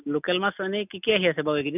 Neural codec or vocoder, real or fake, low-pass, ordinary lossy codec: none; real; 3.6 kHz; none